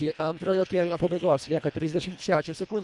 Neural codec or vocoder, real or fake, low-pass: codec, 24 kHz, 1.5 kbps, HILCodec; fake; 10.8 kHz